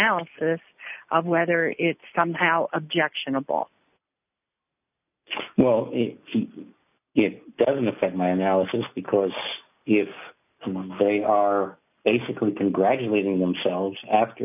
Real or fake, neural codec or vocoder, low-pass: real; none; 3.6 kHz